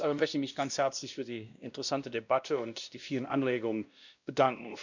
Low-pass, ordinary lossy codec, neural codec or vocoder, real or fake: 7.2 kHz; none; codec, 16 kHz, 1 kbps, X-Codec, WavLM features, trained on Multilingual LibriSpeech; fake